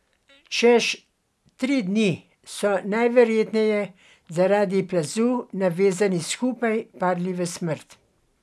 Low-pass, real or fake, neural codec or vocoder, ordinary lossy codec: none; real; none; none